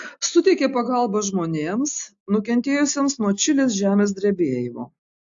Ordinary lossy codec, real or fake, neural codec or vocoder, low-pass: AAC, 64 kbps; real; none; 7.2 kHz